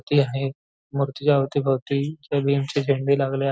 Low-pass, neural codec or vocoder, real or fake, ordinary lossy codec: none; none; real; none